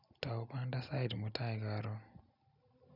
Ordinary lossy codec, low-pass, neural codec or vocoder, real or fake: none; 5.4 kHz; none; real